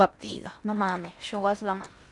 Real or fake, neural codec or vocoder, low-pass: fake; codec, 16 kHz in and 24 kHz out, 0.8 kbps, FocalCodec, streaming, 65536 codes; 10.8 kHz